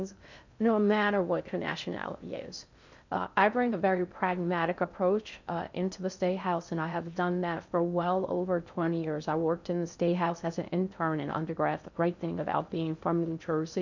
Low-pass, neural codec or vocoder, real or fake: 7.2 kHz; codec, 16 kHz in and 24 kHz out, 0.6 kbps, FocalCodec, streaming, 2048 codes; fake